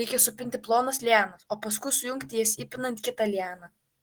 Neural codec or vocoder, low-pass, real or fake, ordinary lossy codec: none; 19.8 kHz; real; Opus, 16 kbps